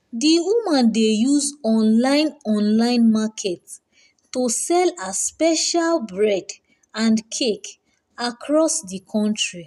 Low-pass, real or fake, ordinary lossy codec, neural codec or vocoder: 14.4 kHz; real; none; none